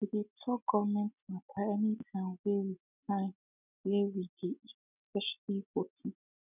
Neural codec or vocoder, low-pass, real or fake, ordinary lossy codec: none; 3.6 kHz; real; none